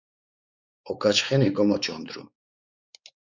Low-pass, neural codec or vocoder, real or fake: 7.2 kHz; codec, 16 kHz, 4 kbps, X-Codec, WavLM features, trained on Multilingual LibriSpeech; fake